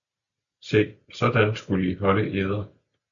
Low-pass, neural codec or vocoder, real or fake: 7.2 kHz; none; real